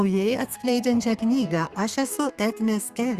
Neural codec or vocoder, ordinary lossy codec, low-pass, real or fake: codec, 32 kHz, 1.9 kbps, SNAC; Opus, 64 kbps; 14.4 kHz; fake